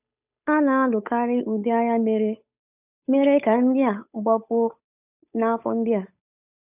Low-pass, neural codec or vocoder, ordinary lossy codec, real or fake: 3.6 kHz; codec, 16 kHz, 8 kbps, FunCodec, trained on Chinese and English, 25 frames a second; none; fake